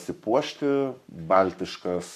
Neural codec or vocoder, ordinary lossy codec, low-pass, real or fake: codec, 44.1 kHz, 7.8 kbps, Pupu-Codec; MP3, 96 kbps; 14.4 kHz; fake